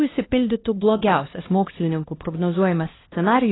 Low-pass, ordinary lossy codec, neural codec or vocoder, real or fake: 7.2 kHz; AAC, 16 kbps; codec, 16 kHz, 1 kbps, X-Codec, HuBERT features, trained on LibriSpeech; fake